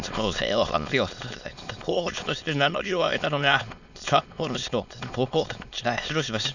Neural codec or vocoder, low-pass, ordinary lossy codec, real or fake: autoencoder, 22.05 kHz, a latent of 192 numbers a frame, VITS, trained on many speakers; 7.2 kHz; none; fake